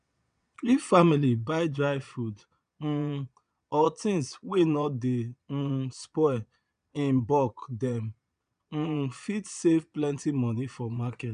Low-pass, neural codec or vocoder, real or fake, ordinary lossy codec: 9.9 kHz; vocoder, 22.05 kHz, 80 mel bands, Vocos; fake; none